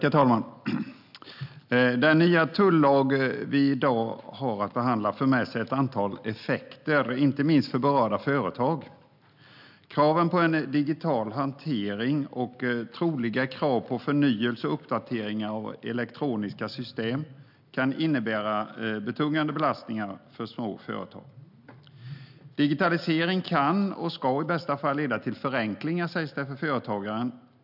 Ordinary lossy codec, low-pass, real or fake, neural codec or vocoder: none; 5.4 kHz; real; none